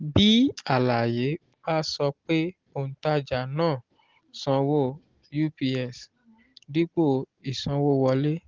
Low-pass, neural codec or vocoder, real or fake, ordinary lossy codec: 7.2 kHz; none; real; Opus, 16 kbps